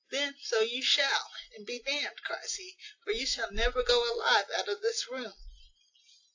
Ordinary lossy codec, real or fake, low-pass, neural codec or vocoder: AAC, 48 kbps; real; 7.2 kHz; none